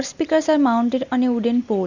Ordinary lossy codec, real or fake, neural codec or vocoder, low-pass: none; real; none; 7.2 kHz